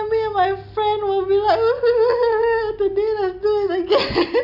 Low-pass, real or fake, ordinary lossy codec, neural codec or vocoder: 5.4 kHz; real; none; none